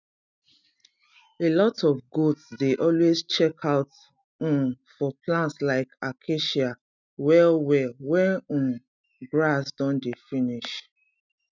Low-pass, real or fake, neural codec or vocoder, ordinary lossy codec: 7.2 kHz; real; none; none